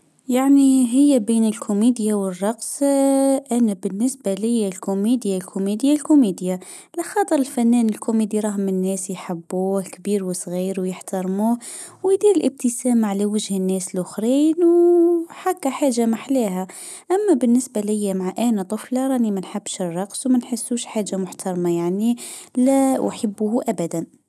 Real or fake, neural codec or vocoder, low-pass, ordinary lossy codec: real; none; none; none